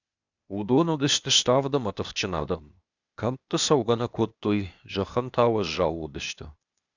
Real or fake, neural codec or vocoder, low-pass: fake; codec, 16 kHz, 0.8 kbps, ZipCodec; 7.2 kHz